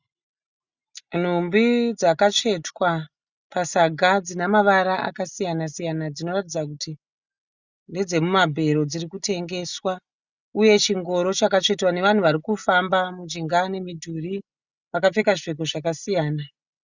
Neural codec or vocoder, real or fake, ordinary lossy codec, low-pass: none; real; Opus, 64 kbps; 7.2 kHz